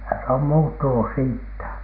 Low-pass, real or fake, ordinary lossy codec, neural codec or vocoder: 5.4 kHz; real; none; none